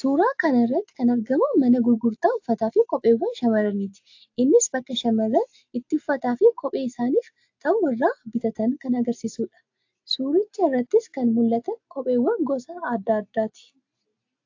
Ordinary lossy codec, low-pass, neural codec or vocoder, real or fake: AAC, 48 kbps; 7.2 kHz; none; real